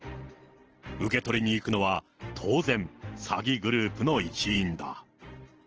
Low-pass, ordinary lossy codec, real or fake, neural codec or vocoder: 7.2 kHz; Opus, 16 kbps; fake; vocoder, 44.1 kHz, 128 mel bands every 512 samples, BigVGAN v2